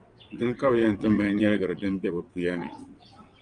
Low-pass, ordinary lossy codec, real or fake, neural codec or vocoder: 9.9 kHz; Opus, 32 kbps; fake; vocoder, 22.05 kHz, 80 mel bands, WaveNeXt